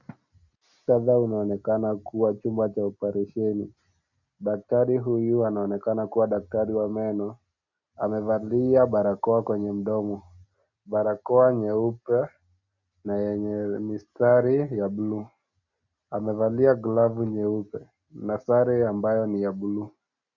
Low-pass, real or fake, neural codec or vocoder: 7.2 kHz; real; none